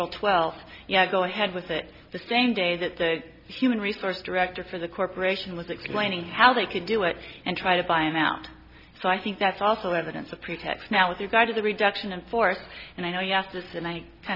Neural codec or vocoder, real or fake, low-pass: none; real; 5.4 kHz